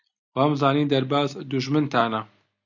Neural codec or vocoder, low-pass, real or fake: none; 7.2 kHz; real